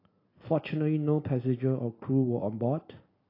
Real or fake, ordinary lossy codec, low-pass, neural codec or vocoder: real; AAC, 24 kbps; 5.4 kHz; none